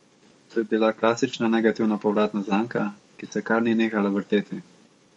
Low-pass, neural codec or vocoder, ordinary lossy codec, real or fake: 19.8 kHz; codec, 44.1 kHz, 7.8 kbps, DAC; MP3, 48 kbps; fake